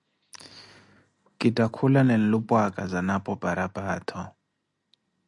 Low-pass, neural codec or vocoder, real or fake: 10.8 kHz; none; real